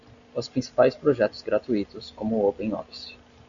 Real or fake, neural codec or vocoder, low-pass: real; none; 7.2 kHz